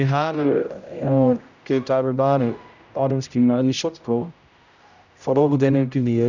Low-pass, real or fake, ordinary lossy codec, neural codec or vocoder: 7.2 kHz; fake; none; codec, 16 kHz, 0.5 kbps, X-Codec, HuBERT features, trained on general audio